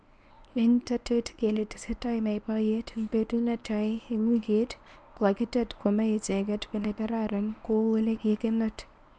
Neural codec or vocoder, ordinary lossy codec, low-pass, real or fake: codec, 24 kHz, 0.9 kbps, WavTokenizer, medium speech release version 1; none; none; fake